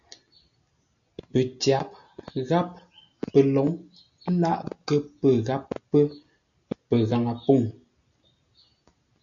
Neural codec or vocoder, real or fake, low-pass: none; real; 7.2 kHz